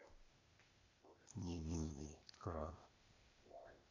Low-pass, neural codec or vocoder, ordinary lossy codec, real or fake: 7.2 kHz; codec, 16 kHz, 0.8 kbps, ZipCodec; AAC, 48 kbps; fake